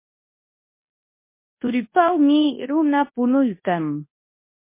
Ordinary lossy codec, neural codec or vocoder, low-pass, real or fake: MP3, 24 kbps; codec, 24 kHz, 0.9 kbps, WavTokenizer, large speech release; 3.6 kHz; fake